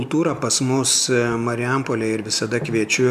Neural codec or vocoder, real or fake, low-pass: none; real; 14.4 kHz